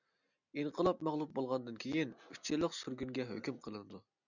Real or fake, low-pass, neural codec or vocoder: real; 7.2 kHz; none